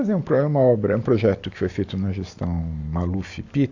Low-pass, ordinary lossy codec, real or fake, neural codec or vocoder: 7.2 kHz; AAC, 48 kbps; real; none